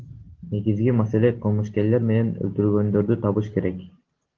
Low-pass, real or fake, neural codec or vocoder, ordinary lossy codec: 7.2 kHz; real; none; Opus, 16 kbps